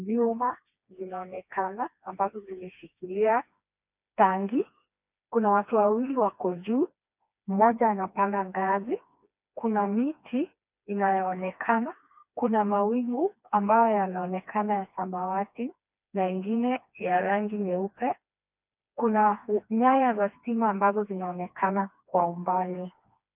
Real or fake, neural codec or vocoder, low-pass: fake; codec, 16 kHz, 2 kbps, FreqCodec, smaller model; 3.6 kHz